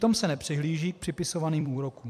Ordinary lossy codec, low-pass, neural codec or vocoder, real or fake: MP3, 96 kbps; 14.4 kHz; vocoder, 44.1 kHz, 128 mel bands every 256 samples, BigVGAN v2; fake